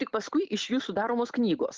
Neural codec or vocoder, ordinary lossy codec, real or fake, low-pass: none; Opus, 16 kbps; real; 7.2 kHz